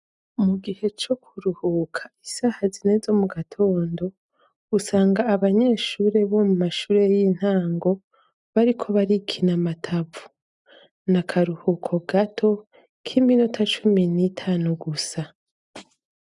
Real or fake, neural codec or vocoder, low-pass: real; none; 10.8 kHz